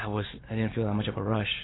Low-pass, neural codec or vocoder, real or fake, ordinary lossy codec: 7.2 kHz; none; real; AAC, 16 kbps